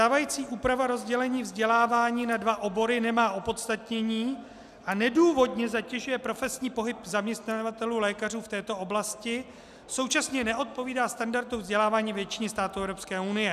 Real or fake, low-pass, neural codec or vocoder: real; 14.4 kHz; none